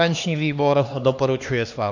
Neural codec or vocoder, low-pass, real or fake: codec, 16 kHz, 2 kbps, X-Codec, HuBERT features, trained on LibriSpeech; 7.2 kHz; fake